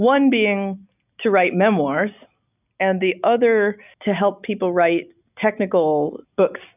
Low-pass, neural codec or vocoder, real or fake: 3.6 kHz; none; real